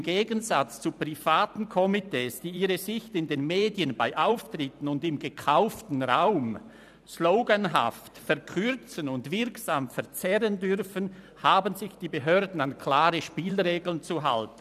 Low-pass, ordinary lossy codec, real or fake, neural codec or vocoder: 14.4 kHz; none; fake; vocoder, 44.1 kHz, 128 mel bands every 512 samples, BigVGAN v2